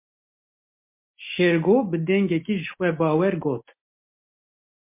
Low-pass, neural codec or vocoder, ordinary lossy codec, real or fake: 3.6 kHz; none; MP3, 24 kbps; real